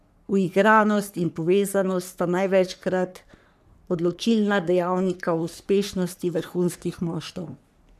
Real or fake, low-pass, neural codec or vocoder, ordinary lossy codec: fake; 14.4 kHz; codec, 44.1 kHz, 3.4 kbps, Pupu-Codec; none